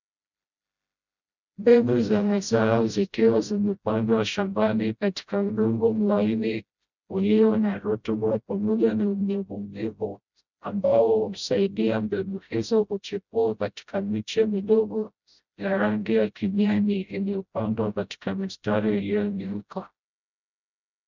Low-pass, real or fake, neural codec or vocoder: 7.2 kHz; fake; codec, 16 kHz, 0.5 kbps, FreqCodec, smaller model